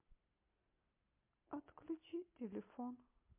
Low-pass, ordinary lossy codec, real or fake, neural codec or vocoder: 3.6 kHz; MP3, 24 kbps; real; none